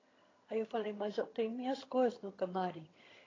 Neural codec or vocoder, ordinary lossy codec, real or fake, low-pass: vocoder, 22.05 kHz, 80 mel bands, HiFi-GAN; AAC, 32 kbps; fake; 7.2 kHz